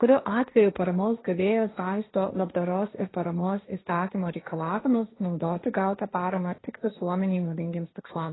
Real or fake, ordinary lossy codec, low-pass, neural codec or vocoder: fake; AAC, 16 kbps; 7.2 kHz; codec, 16 kHz, 1.1 kbps, Voila-Tokenizer